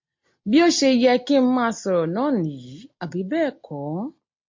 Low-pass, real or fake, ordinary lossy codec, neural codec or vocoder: 7.2 kHz; real; MP3, 48 kbps; none